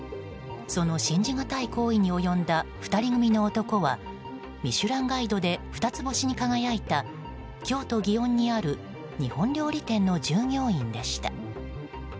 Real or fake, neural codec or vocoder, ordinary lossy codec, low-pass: real; none; none; none